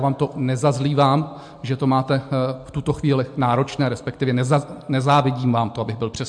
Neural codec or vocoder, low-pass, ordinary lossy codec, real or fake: none; 9.9 kHz; MP3, 64 kbps; real